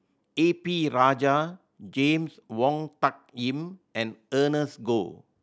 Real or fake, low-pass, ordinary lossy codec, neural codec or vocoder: real; none; none; none